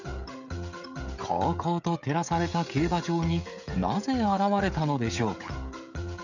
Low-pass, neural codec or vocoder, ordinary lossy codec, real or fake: 7.2 kHz; codec, 16 kHz, 16 kbps, FreqCodec, smaller model; none; fake